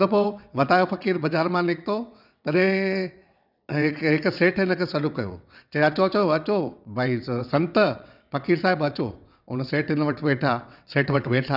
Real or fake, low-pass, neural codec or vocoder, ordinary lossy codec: fake; 5.4 kHz; vocoder, 22.05 kHz, 80 mel bands, WaveNeXt; none